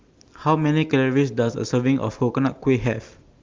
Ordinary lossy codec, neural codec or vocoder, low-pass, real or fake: Opus, 32 kbps; none; 7.2 kHz; real